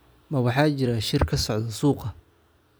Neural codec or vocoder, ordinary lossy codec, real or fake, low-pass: none; none; real; none